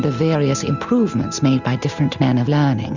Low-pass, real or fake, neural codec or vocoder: 7.2 kHz; real; none